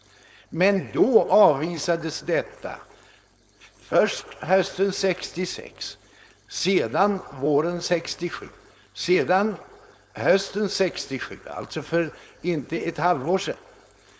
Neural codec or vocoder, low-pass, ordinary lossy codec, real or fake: codec, 16 kHz, 4.8 kbps, FACodec; none; none; fake